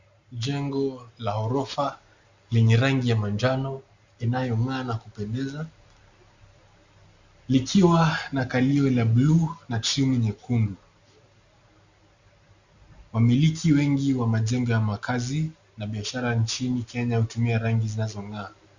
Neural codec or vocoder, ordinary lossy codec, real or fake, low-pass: none; Opus, 64 kbps; real; 7.2 kHz